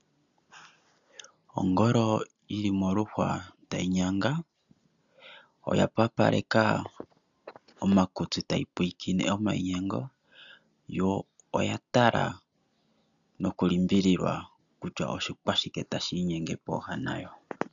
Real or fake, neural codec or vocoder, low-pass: real; none; 7.2 kHz